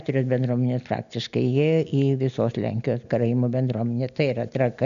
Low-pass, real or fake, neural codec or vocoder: 7.2 kHz; real; none